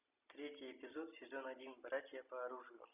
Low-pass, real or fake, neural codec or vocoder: 3.6 kHz; real; none